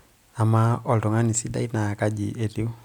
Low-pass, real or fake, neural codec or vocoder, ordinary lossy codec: 19.8 kHz; real; none; none